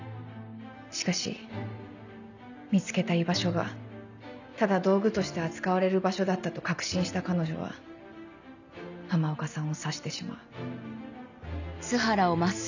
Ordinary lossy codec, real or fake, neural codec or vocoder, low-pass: none; real; none; 7.2 kHz